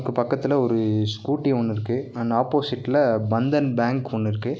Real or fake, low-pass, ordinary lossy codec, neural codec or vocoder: real; none; none; none